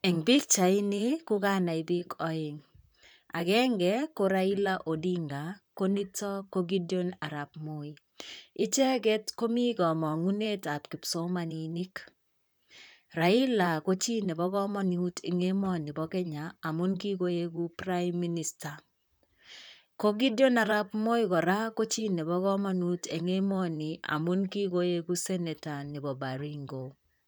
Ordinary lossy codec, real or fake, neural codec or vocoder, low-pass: none; fake; vocoder, 44.1 kHz, 128 mel bands, Pupu-Vocoder; none